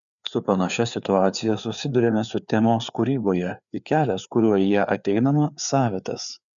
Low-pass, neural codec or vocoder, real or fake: 7.2 kHz; codec, 16 kHz, 4 kbps, FreqCodec, larger model; fake